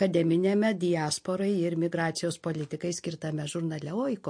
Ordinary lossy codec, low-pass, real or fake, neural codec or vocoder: MP3, 48 kbps; 9.9 kHz; real; none